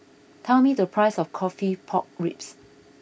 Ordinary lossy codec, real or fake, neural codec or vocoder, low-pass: none; real; none; none